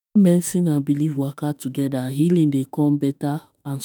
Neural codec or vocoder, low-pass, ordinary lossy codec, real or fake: autoencoder, 48 kHz, 32 numbers a frame, DAC-VAE, trained on Japanese speech; none; none; fake